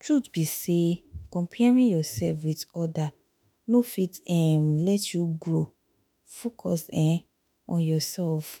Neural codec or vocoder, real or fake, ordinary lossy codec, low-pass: autoencoder, 48 kHz, 32 numbers a frame, DAC-VAE, trained on Japanese speech; fake; none; none